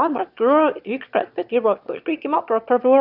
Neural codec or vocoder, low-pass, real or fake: autoencoder, 22.05 kHz, a latent of 192 numbers a frame, VITS, trained on one speaker; 5.4 kHz; fake